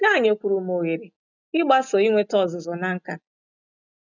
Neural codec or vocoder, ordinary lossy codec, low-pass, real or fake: none; none; 7.2 kHz; real